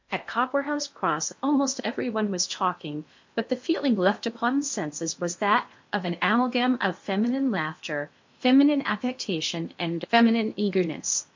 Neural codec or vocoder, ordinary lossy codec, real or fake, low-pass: codec, 16 kHz in and 24 kHz out, 0.8 kbps, FocalCodec, streaming, 65536 codes; MP3, 48 kbps; fake; 7.2 kHz